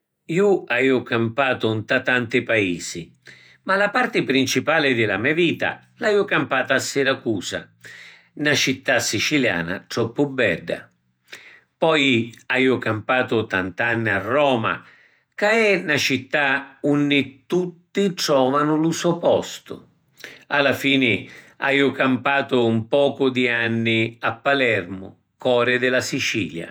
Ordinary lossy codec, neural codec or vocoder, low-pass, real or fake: none; vocoder, 48 kHz, 128 mel bands, Vocos; none; fake